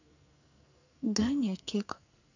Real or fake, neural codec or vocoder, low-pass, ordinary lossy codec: fake; codec, 44.1 kHz, 2.6 kbps, SNAC; 7.2 kHz; none